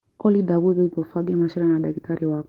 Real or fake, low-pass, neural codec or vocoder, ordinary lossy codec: fake; 19.8 kHz; codec, 44.1 kHz, 7.8 kbps, DAC; Opus, 16 kbps